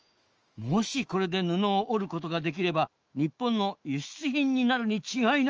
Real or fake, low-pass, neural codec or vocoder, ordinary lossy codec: fake; 7.2 kHz; autoencoder, 48 kHz, 128 numbers a frame, DAC-VAE, trained on Japanese speech; Opus, 24 kbps